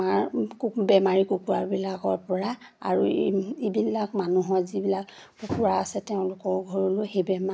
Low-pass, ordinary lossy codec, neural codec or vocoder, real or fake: none; none; none; real